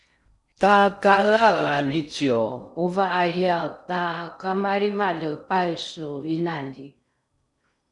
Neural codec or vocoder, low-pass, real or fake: codec, 16 kHz in and 24 kHz out, 0.6 kbps, FocalCodec, streaming, 4096 codes; 10.8 kHz; fake